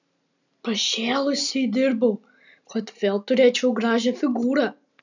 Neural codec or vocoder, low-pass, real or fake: none; 7.2 kHz; real